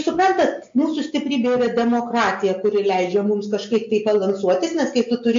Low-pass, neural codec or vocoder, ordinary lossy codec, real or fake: 7.2 kHz; none; MP3, 48 kbps; real